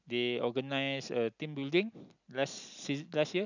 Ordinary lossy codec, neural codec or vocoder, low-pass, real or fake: none; none; 7.2 kHz; real